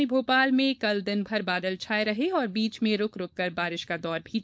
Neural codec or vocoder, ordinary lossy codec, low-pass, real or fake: codec, 16 kHz, 4.8 kbps, FACodec; none; none; fake